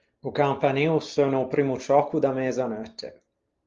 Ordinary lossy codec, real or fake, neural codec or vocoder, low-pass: Opus, 32 kbps; real; none; 7.2 kHz